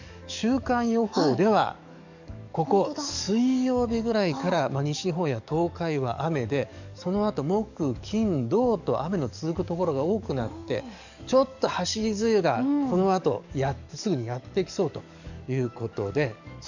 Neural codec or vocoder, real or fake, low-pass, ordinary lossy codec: codec, 44.1 kHz, 7.8 kbps, DAC; fake; 7.2 kHz; none